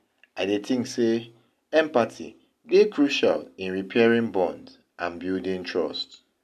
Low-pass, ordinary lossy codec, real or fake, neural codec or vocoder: 14.4 kHz; none; real; none